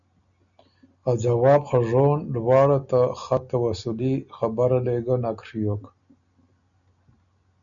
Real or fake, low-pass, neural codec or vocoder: real; 7.2 kHz; none